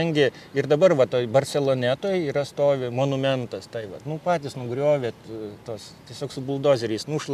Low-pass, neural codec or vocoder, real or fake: 14.4 kHz; none; real